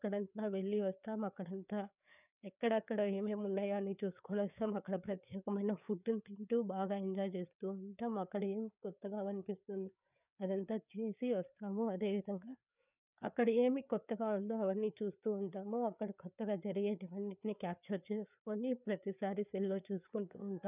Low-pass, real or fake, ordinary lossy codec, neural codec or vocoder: 3.6 kHz; fake; none; codec, 16 kHz, 4 kbps, FreqCodec, larger model